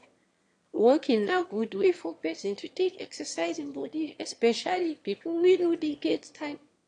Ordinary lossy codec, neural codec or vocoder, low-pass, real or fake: AAC, 48 kbps; autoencoder, 22.05 kHz, a latent of 192 numbers a frame, VITS, trained on one speaker; 9.9 kHz; fake